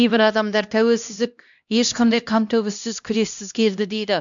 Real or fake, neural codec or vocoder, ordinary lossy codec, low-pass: fake; codec, 16 kHz, 1 kbps, X-Codec, HuBERT features, trained on LibriSpeech; MP3, 64 kbps; 7.2 kHz